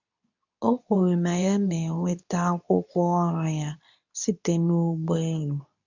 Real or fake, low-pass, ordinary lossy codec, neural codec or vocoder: fake; 7.2 kHz; none; codec, 24 kHz, 0.9 kbps, WavTokenizer, medium speech release version 2